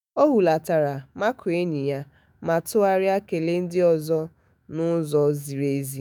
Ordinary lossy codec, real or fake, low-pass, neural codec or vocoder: none; fake; none; autoencoder, 48 kHz, 128 numbers a frame, DAC-VAE, trained on Japanese speech